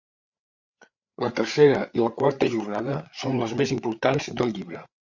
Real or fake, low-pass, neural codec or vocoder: fake; 7.2 kHz; codec, 16 kHz, 8 kbps, FreqCodec, larger model